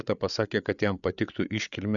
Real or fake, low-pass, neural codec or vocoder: fake; 7.2 kHz; codec, 16 kHz, 8 kbps, FreqCodec, larger model